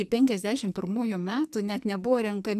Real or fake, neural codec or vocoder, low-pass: fake; codec, 44.1 kHz, 2.6 kbps, SNAC; 14.4 kHz